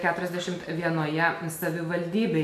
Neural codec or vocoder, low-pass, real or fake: none; 14.4 kHz; real